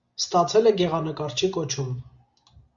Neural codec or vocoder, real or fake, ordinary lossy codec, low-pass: none; real; Opus, 64 kbps; 7.2 kHz